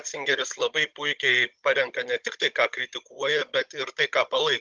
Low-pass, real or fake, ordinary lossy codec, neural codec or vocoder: 7.2 kHz; fake; Opus, 24 kbps; codec, 16 kHz, 16 kbps, FunCodec, trained on Chinese and English, 50 frames a second